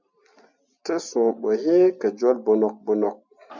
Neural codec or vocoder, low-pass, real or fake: none; 7.2 kHz; real